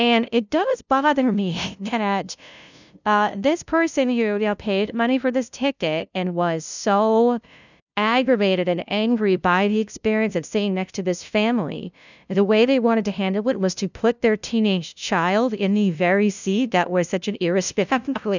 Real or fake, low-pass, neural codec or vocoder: fake; 7.2 kHz; codec, 16 kHz, 0.5 kbps, FunCodec, trained on LibriTTS, 25 frames a second